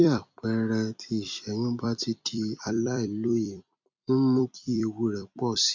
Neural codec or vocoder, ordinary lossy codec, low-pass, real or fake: vocoder, 44.1 kHz, 128 mel bands every 256 samples, BigVGAN v2; MP3, 64 kbps; 7.2 kHz; fake